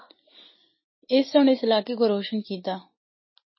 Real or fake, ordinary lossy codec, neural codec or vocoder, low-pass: real; MP3, 24 kbps; none; 7.2 kHz